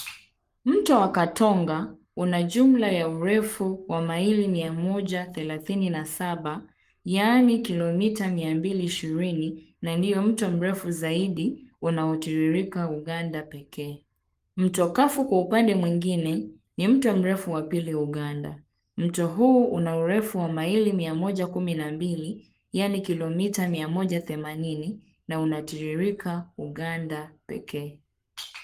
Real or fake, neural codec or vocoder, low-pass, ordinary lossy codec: fake; codec, 44.1 kHz, 7.8 kbps, Pupu-Codec; 14.4 kHz; Opus, 32 kbps